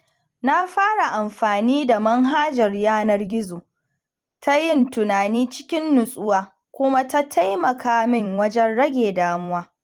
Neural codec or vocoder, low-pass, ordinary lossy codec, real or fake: vocoder, 44.1 kHz, 128 mel bands every 256 samples, BigVGAN v2; 19.8 kHz; Opus, 24 kbps; fake